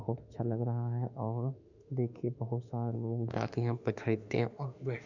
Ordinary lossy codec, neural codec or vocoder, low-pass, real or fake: none; autoencoder, 48 kHz, 32 numbers a frame, DAC-VAE, trained on Japanese speech; 7.2 kHz; fake